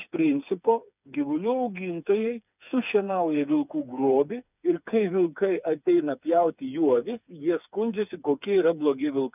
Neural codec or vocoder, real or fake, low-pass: codec, 16 kHz, 4 kbps, FreqCodec, smaller model; fake; 3.6 kHz